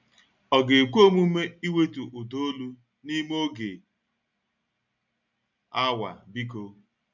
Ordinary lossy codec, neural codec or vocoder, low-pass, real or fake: none; none; 7.2 kHz; real